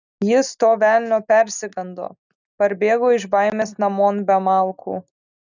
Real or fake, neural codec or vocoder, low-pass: real; none; 7.2 kHz